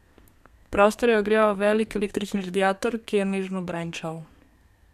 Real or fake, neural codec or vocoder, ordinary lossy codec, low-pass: fake; codec, 32 kHz, 1.9 kbps, SNAC; none; 14.4 kHz